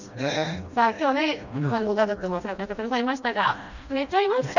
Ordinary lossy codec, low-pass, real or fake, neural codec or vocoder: none; 7.2 kHz; fake; codec, 16 kHz, 1 kbps, FreqCodec, smaller model